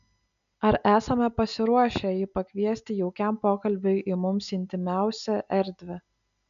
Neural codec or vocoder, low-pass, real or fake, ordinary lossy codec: none; 7.2 kHz; real; MP3, 96 kbps